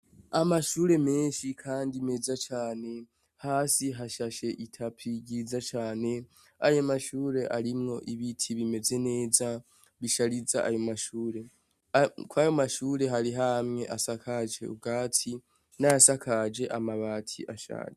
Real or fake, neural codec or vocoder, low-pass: real; none; 14.4 kHz